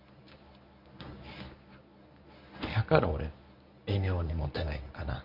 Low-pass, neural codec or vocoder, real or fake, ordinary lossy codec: 5.4 kHz; codec, 24 kHz, 0.9 kbps, WavTokenizer, medium speech release version 1; fake; none